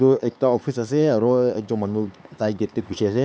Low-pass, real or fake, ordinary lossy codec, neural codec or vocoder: none; fake; none; codec, 16 kHz, 4 kbps, X-Codec, HuBERT features, trained on LibriSpeech